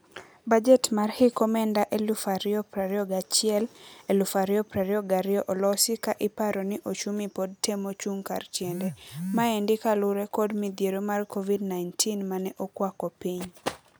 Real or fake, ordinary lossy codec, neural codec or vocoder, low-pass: real; none; none; none